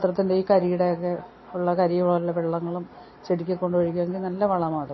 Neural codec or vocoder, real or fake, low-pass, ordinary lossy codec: none; real; 7.2 kHz; MP3, 24 kbps